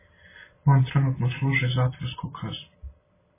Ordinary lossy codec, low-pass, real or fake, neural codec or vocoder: MP3, 16 kbps; 3.6 kHz; real; none